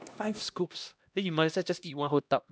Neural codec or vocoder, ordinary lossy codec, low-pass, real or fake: codec, 16 kHz, 1 kbps, X-Codec, HuBERT features, trained on LibriSpeech; none; none; fake